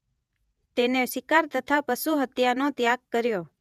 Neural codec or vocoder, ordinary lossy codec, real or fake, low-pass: vocoder, 48 kHz, 128 mel bands, Vocos; none; fake; 14.4 kHz